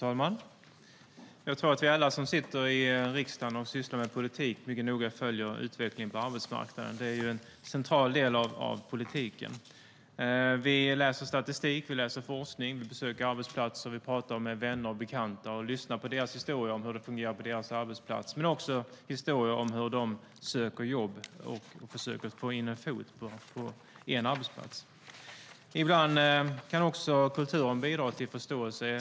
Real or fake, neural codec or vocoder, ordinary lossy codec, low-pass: real; none; none; none